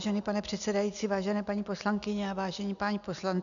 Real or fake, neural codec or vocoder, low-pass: real; none; 7.2 kHz